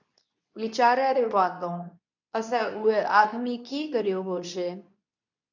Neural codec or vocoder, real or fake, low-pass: codec, 24 kHz, 0.9 kbps, WavTokenizer, medium speech release version 2; fake; 7.2 kHz